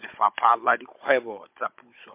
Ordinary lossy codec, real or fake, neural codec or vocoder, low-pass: MP3, 32 kbps; fake; codec, 16 kHz, 16 kbps, FunCodec, trained on Chinese and English, 50 frames a second; 3.6 kHz